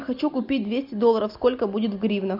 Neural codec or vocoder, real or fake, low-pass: none; real; 5.4 kHz